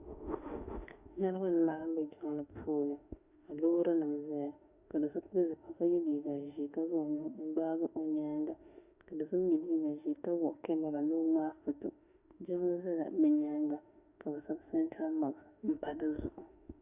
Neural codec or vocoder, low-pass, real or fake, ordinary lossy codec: autoencoder, 48 kHz, 32 numbers a frame, DAC-VAE, trained on Japanese speech; 3.6 kHz; fake; Opus, 64 kbps